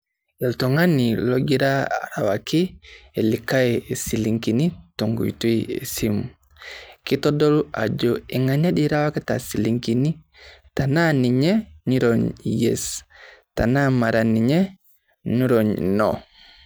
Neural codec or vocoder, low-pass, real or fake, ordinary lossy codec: none; none; real; none